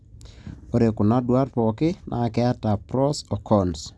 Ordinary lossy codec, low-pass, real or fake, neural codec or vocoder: none; none; real; none